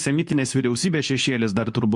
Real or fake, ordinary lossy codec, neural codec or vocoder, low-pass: fake; MP3, 64 kbps; codec, 24 kHz, 0.9 kbps, WavTokenizer, medium speech release version 2; 10.8 kHz